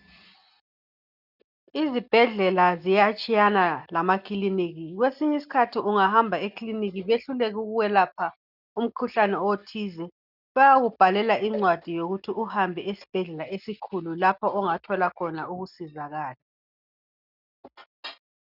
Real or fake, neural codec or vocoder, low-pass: real; none; 5.4 kHz